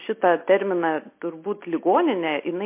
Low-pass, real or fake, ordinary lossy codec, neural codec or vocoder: 3.6 kHz; real; MP3, 24 kbps; none